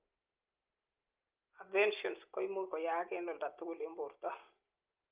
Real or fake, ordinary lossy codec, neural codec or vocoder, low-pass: real; Opus, 24 kbps; none; 3.6 kHz